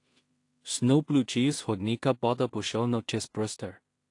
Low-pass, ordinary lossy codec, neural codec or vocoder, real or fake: 10.8 kHz; AAC, 48 kbps; codec, 16 kHz in and 24 kHz out, 0.4 kbps, LongCat-Audio-Codec, two codebook decoder; fake